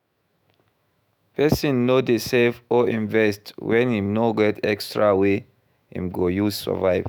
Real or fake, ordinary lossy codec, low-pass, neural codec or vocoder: fake; none; none; autoencoder, 48 kHz, 128 numbers a frame, DAC-VAE, trained on Japanese speech